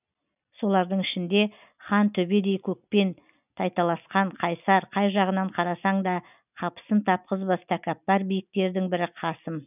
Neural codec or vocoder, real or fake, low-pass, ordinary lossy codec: none; real; 3.6 kHz; none